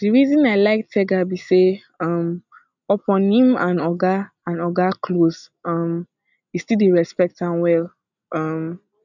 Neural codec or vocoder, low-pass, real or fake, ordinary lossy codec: none; 7.2 kHz; real; none